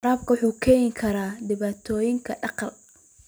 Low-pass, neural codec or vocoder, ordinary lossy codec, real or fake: none; none; none; real